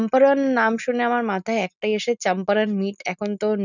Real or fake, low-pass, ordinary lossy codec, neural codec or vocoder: real; 7.2 kHz; none; none